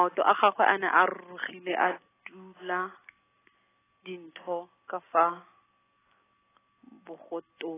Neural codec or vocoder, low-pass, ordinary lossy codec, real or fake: none; 3.6 kHz; AAC, 16 kbps; real